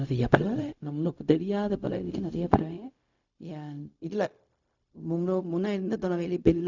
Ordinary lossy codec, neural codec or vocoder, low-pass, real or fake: none; codec, 16 kHz, 0.4 kbps, LongCat-Audio-Codec; 7.2 kHz; fake